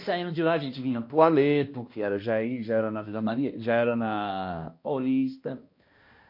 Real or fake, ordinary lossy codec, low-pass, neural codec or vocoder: fake; MP3, 32 kbps; 5.4 kHz; codec, 16 kHz, 1 kbps, X-Codec, HuBERT features, trained on balanced general audio